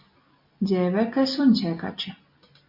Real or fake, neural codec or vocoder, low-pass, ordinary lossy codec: real; none; 5.4 kHz; MP3, 32 kbps